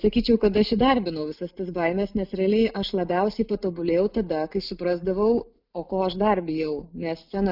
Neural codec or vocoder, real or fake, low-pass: none; real; 5.4 kHz